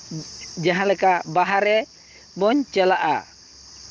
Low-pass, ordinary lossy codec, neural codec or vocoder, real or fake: 7.2 kHz; Opus, 24 kbps; none; real